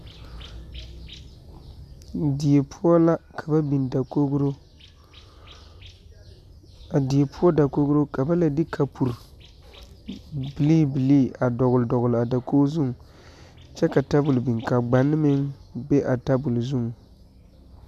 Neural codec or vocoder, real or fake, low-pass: none; real; 14.4 kHz